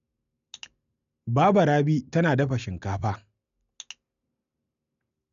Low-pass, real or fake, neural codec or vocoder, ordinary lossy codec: 7.2 kHz; real; none; none